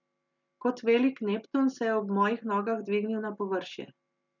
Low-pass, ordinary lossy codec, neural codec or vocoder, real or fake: 7.2 kHz; none; none; real